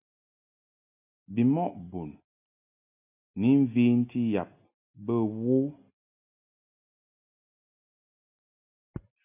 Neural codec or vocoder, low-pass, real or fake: none; 3.6 kHz; real